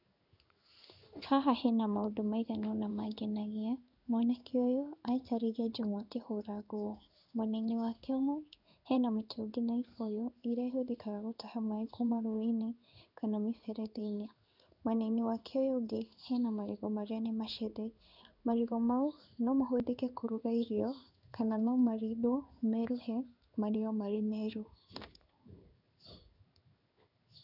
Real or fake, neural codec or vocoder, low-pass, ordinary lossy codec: fake; codec, 16 kHz in and 24 kHz out, 1 kbps, XY-Tokenizer; 5.4 kHz; none